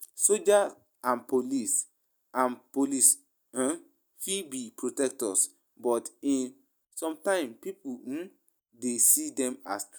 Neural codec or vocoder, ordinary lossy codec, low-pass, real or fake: none; none; none; real